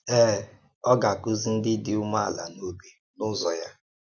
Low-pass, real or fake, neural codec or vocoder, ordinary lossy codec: none; real; none; none